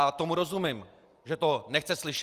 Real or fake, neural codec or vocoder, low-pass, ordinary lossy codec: real; none; 14.4 kHz; Opus, 32 kbps